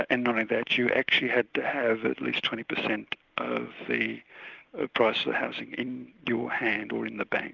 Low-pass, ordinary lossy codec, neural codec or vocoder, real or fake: 7.2 kHz; Opus, 24 kbps; none; real